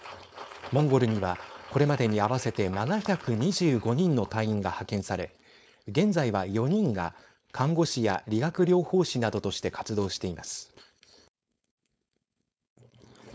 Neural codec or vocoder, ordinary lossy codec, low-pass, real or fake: codec, 16 kHz, 4.8 kbps, FACodec; none; none; fake